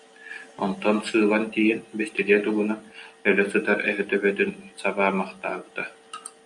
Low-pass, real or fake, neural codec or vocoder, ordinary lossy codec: 10.8 kHz; real; none; MP3, 48 kbps